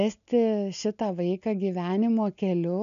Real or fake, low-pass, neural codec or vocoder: real; 7.2 kHz; none